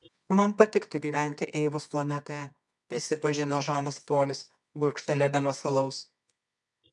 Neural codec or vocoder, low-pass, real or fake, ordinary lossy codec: codec, 24 kHz, 0.9 kbps, WavTokenizer, medium music audio release; 10.8 kHz; fake; MP3, 96 kbps